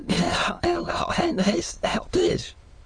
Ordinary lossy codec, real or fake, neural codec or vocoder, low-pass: Opus, 32 kbps; fake; autoencoder, 22.05 kHz, a latent of 192 numbers a frame, VITS, trained on many speakers; 9.9 kHz